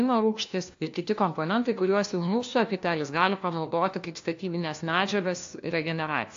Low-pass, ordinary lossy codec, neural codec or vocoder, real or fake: 7.2 kHz; AAC, 48 kbps; codec, 16 kHz, 1 kbps, FunCodec, trained on LibriTTS, 50 frames a second; fake